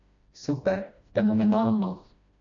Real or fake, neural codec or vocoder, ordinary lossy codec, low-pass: fake; codec, 16 kHz, 1 kbps, FreqCodec, smaller model; MP3, 64 kbps; 7.2 kHz